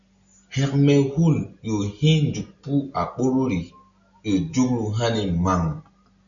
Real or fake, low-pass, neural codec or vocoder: real; 7.2 kHz; none